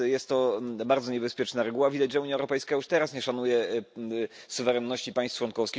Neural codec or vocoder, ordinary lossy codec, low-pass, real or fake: none; none; none; real